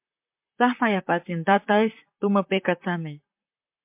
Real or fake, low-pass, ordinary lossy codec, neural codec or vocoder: fake; 3.6 kHz; MP3, 32 kbps; vocoder, 44.1 kHz, 128 mel bands, Pupu-Vocoder